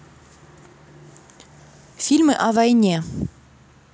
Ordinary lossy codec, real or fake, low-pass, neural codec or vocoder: none; real; none; none